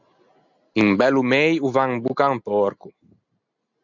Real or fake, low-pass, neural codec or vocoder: real; 7.2 kHz; none